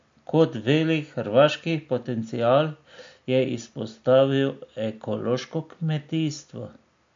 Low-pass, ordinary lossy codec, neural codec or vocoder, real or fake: 7.2 kHz; MP3, 48 kbps; none; real